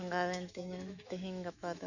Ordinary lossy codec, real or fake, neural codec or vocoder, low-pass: none; fake; autoencoder, 48 kHz, 128 numbers a frame, DAC-VAE, trained on Japanese speech; 7.2 kHz